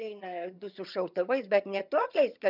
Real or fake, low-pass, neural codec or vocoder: fake; 5.4 kHz; vocoder, 22.05 kHz, 80 mel bands, HiFi-GAN